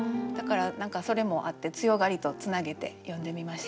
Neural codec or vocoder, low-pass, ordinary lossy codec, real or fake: none; none; none; real